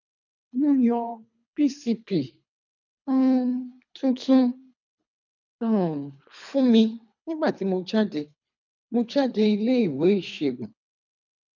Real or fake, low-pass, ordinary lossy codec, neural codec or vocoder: fake; 7.2 kHz; none; codec, 24 kHz, 3 kbps, HILCodec